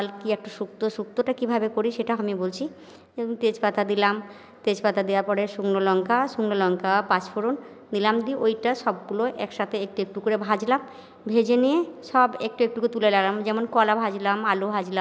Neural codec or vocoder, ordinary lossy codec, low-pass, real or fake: none; none; none; real